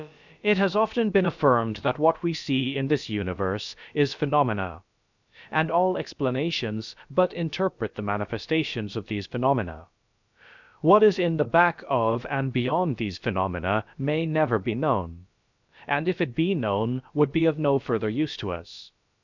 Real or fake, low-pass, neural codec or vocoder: fake; 7.2 kHz; codec, 16 kHz, about 1 kbps, DyCAST, with the encoder's durations